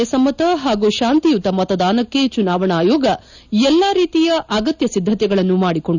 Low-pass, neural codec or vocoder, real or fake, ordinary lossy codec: 7.2 kHz; none; real; none